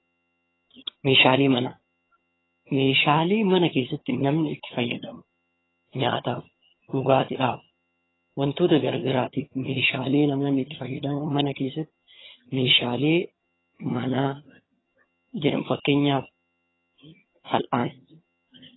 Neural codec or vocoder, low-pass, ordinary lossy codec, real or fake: vocoder, 22.05 kHz, 80 mel bands, HiFi-GAN; 7.2 kHz; AAC, 16 kbps; fake